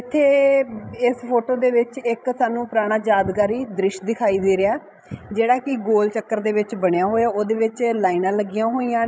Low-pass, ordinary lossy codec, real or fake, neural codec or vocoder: none; none; fake; codec, 16 kHz, 16 kbps, FreqCodec, larger model